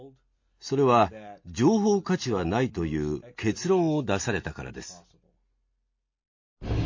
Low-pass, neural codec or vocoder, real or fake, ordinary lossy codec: 7.2 kHz; none; real; MP3, 32 kbps